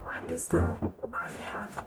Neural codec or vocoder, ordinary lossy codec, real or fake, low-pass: codec, 44.1 kHz, 0.9 kbps, DAC; none; fake; none